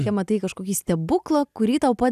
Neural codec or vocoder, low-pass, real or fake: none; 14.4 kHz; real